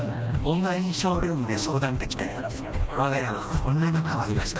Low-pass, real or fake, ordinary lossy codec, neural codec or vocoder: none; fake; none; codec, 16 kHz, 1 kbps, FreqCodec, smaller model